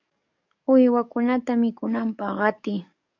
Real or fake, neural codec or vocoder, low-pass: fake; codec, 44.1 kHz, 7.8 kbps, DAC; 7.2 kHz